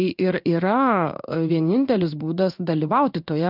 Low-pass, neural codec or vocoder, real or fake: 5.4 kHz; codec, 16 kHz in and 24 kHz out, 1 kbps, XY-Tokenizer; fake